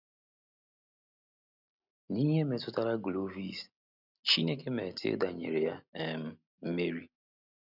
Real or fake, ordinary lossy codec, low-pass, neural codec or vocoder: real; none; 5.4 kHz; none